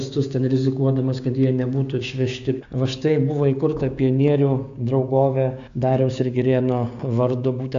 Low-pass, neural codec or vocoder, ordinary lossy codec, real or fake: 7.2 kHz; codec, 16 kHz, 6 kbps, DAC; AAC, 64 kbps; fake